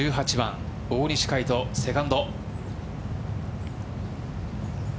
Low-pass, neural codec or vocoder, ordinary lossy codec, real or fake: none; none; none; real